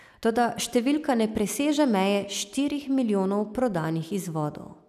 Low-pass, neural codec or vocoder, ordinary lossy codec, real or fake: 14.4 kHz; none; none; real